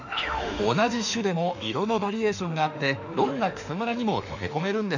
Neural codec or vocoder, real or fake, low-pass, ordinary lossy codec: autoencoder, 48 kHz, 32 numbers a frame, DAC-VAE, trained on Japanese speech; fake; 7.2 kHz; none